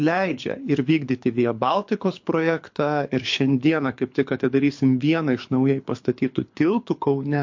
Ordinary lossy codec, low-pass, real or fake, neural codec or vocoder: MP3, 48 kbps; 7.2 kHz; fake; codec, 24 kHz, 6 kbps, HILCodec